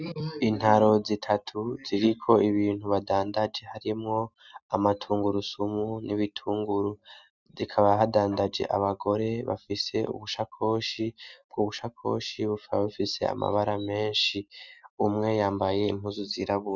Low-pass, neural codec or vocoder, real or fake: 7.2 kHz; none; real